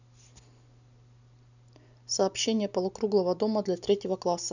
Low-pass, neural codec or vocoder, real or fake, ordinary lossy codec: 7.2 kHz; none; real; none